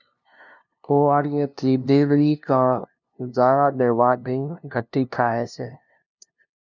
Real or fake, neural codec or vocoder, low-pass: fake; codec, 16 kHz, 0.5 kbps, FunCodec, trained on LibriTTS, 25 frames a second; 7.2 kHz